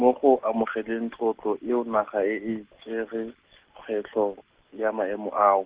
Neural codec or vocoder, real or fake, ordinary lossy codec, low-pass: none; real; Opus, 16 kbps; 3.6 kHz